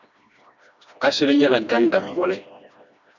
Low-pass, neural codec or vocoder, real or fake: 7.2 kHz; codec, 16 kHz, 1 kbps, FreqCodec, smaller model; fake